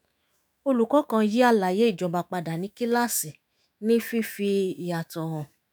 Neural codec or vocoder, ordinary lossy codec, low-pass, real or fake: autoencoder, 48 kHz, 128 numbers a frame, DAC-VAE, trained on Japanese speech; none; none; fake